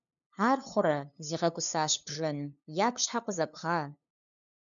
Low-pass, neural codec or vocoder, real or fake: 7.2 kHz; codec, 16 kHz, 2 kbps, FunCodec, trained on LibriTTS, 25 frames a second; fake